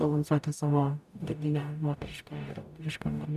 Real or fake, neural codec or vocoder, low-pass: fake; codec, 44.1 kHz, 0.9 kbps, DAC; 14.4 kHz